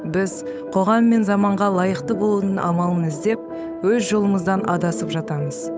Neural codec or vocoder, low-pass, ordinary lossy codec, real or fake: codec, 16 kHz, 8 kbps, FunCodec, trained on Chinese and English, 25 frames a second; none; none; fake